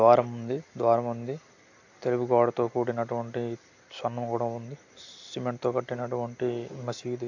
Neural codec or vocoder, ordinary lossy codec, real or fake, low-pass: none; AAC, 48 kbps; real; 7.2 kHz